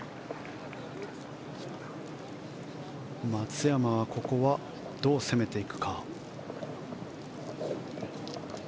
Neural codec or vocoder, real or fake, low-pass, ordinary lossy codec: none; real; none; none